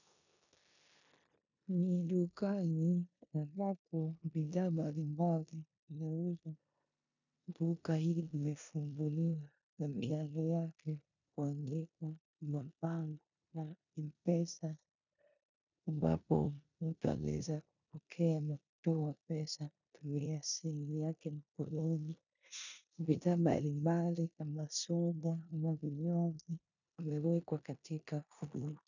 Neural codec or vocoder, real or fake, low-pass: codec, 16 kHz in and 24 kHz out, 0.9 kbps, LongCat-Audio-Codec, four codebook decoder; fake; 7.2 kHz